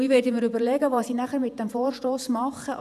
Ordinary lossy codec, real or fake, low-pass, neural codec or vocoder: none; fake; 14.4 kHz; vocoder, 48 kHz, 128 mel bands, Vocos